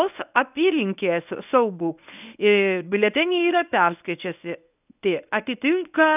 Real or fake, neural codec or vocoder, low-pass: fake; codec, 24 kHz, 0.9 kbps, WavTokenizer, medium speech release version 1; 3.6 kHz